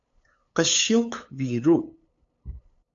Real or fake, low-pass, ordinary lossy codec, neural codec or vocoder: fake; 7.2 kHz; AAC, 48 kbps; codec, 16 kHz, 8 kbps, FunCodec, trained on LibriTTS, 25 frames a second